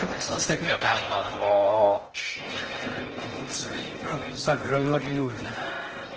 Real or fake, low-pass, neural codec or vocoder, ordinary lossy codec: fake; 7.2 kHz; codec, 16 kHz in and 24 kHz out, 0.6 kbps, FocalCodec, streaming, 4096 codes; Opus, 16 kbps